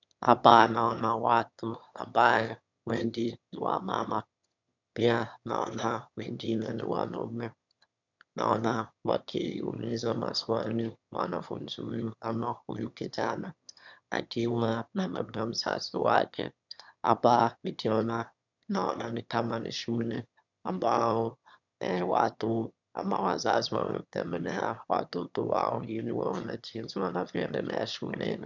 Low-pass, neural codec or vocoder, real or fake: 7.2 kHz; autoencoder, 22.05 kHz, a latent of 192 numbers a frame, VITS, trained on one speaker; fake